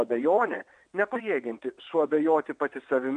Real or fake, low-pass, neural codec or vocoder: fake; 9.9 kHz; vocoder, 22.05 kHz, 80 mel bands, WaveNeXt